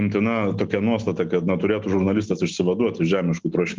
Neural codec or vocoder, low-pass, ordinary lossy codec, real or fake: none; 7.2 kHz; Opus, 32 kbps; real